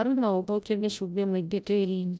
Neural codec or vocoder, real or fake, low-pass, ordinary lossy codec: codec, 16 kHz, 0.5 kbps, FreqCodec, larger model; fake; none; none